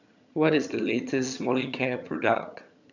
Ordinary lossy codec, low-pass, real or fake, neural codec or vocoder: none; 7.2 kHz; fake; vocoder, 22.05 kHz, 80 mel bands, HiFi-GAN